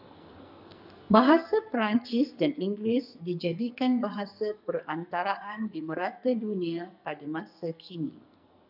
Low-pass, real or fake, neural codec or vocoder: 5.4 kHz; fake; codec, 44.1 kHz, 2.6 kbps, SNAC